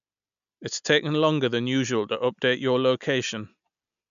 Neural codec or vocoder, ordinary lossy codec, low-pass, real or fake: none; none; 7.2 kHz; real